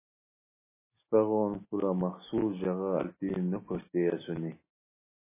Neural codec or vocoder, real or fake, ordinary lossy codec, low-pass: none; real; MP3, 16 kbps; 3.6 kHz